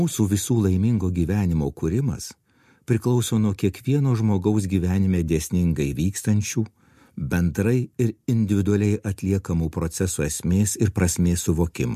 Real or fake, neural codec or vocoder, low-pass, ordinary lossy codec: real; none; 14.4 kHz; MP3, 64 kbps